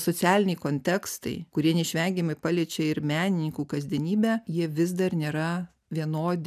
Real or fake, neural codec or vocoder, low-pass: real; none; 14.4 kHz